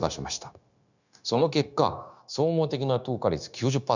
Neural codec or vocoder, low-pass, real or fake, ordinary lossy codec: codec, 16 kHz, 0.9 kbps, LongCat-Audio-Codec; 7.2 kHz; fake; none